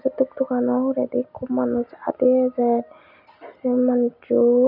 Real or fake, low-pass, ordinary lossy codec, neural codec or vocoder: real; 5.4 kHz; none; none